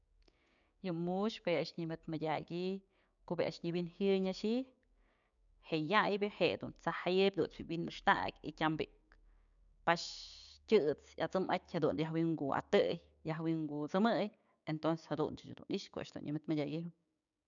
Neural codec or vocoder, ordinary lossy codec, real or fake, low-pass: codec, 16 kHz, 6 kbps, DAC; none; fake; 7.2 kHz